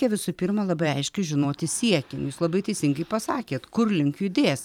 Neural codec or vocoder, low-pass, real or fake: vocoder, 44.1 kHz, 128 mel bands every 512 samples, BigVGAN v2; 19.8 kHz; fake